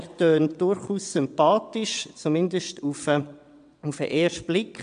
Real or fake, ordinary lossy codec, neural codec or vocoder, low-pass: fake; AAC, 96 kbps; vocoder, 22.05 kHz, 80 mel bands, WaveNeXt; 9.9 kHz